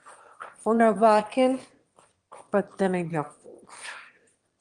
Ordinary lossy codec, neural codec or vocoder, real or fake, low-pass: Opus, 24 kbps; autoencoder, 22.05 kHz, a latent of 192 numbers a frame, VITS, trained on one speaker; fake; 9.9 kHz